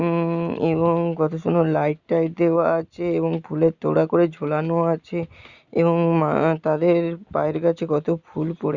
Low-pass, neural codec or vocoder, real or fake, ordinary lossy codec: 7.2 kHz; none; real; Opus, 64 kbps